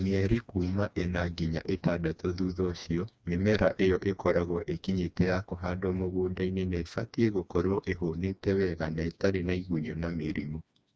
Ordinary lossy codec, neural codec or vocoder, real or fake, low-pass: none; codec, 16 kHz, 2 kbps, FreqCodec, smaller model; fake; none